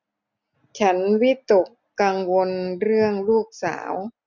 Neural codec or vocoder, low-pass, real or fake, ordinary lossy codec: none; 7.2 kHz; real; none